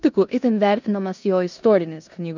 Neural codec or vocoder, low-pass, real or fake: codec, 16 kHz in and 24 kHz out, 0.9 kbps, LongCat-Audio-Codec, four codebook decoder; 7.2 kHz; fake